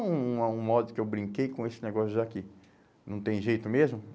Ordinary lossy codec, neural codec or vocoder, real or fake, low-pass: none; none; real; none